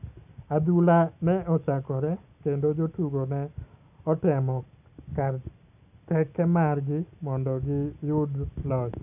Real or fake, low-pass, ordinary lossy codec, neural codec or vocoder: real; 3.6 kHz; none; none